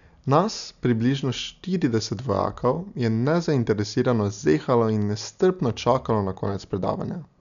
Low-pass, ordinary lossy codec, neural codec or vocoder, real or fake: 7.2 kHz; none; none; real